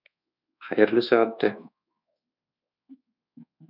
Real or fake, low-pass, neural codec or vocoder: fake; 5.4 kHz; codec, 24 kHz, 1.2 kbps, DualCodec